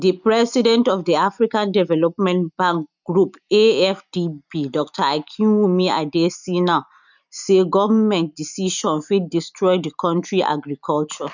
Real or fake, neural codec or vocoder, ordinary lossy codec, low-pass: real; none; none; 7.2 kHz